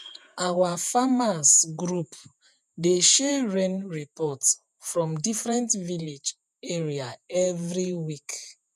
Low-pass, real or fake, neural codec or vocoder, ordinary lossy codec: 14.4 kHz; fake; vocoder, 48 kHz, 128 mel bands, Vocos; none